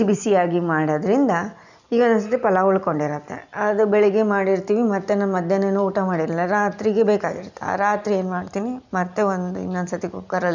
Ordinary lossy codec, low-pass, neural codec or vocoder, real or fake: none; 7.2 kHz; none; real